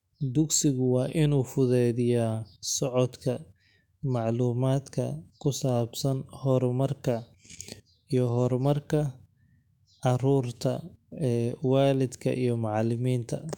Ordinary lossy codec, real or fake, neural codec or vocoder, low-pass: none; fake; autoencoder, 48 kHz, 128 numbers a frame, DAC-VAE, trained on Japanese speech; 19.8 kHz